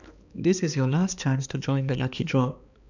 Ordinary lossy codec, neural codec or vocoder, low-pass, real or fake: none; codec, 16 kHz, 2 kbps, X-Codec, HuBERT features, trained on balanced general audio; 7.2 kHz; fake